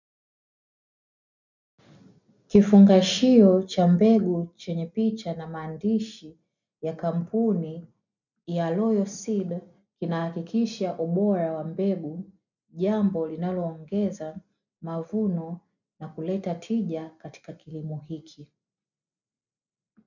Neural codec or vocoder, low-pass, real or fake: none; 7.2 kHz; real